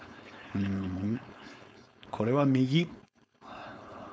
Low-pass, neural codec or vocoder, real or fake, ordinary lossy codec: none; codec, 16 kHz, 4.8 kbps, FACodec; fake; none